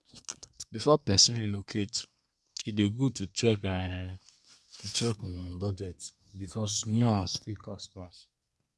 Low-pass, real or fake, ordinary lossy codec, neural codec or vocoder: none; fake; none; codec, 24 kHz, 1 kbps, SNAC